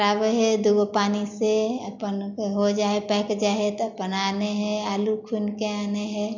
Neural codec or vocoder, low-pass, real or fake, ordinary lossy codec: none; 7.2 kHz; real; none